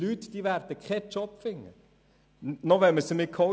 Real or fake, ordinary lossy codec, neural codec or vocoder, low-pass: real; none; none; none